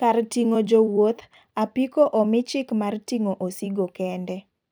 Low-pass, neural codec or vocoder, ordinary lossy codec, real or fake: none; vocoder, 44.1 kHz, 128 mel bands every 256 samples, BigVGAN v2; none; fake